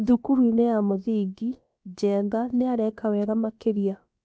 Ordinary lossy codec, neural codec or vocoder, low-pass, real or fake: none; codec, 16 kHz, about 1 kbps, DyCAST, with the encoder's durations; none; fake